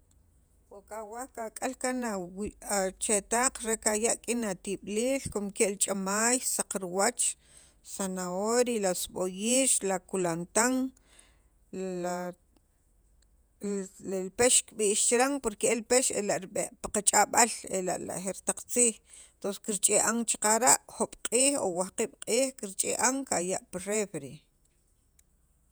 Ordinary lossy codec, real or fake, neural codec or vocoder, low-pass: none; fake; vocoder, 48 kHz, 128 mel bands, Vocos; none